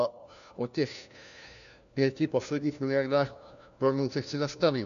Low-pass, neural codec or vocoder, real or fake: 7.2 kHz; codec, 16 kHz, 1 kbps, FunCodec, trained on LibriTTS, 50 frames a second; fake